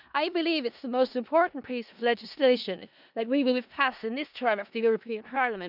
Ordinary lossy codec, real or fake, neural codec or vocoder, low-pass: none; fake; codec, 16 kHz in and 24 kHz out, 0.4 kbps, LongCat-Audio-Codec, four codebook decoder; 5.4 kHz